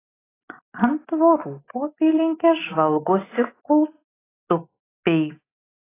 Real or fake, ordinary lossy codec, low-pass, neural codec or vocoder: real; AAC, 16 kbps; 3.6 kHz; none